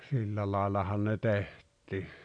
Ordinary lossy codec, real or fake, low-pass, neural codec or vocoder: none; real; 9.9 kHz; none